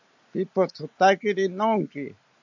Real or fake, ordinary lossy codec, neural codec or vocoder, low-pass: real; AAC, 48 kbps; none; 7.2 kHz